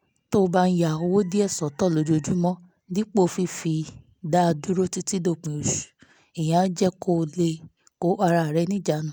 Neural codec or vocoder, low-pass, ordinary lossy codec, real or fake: none; none; none; real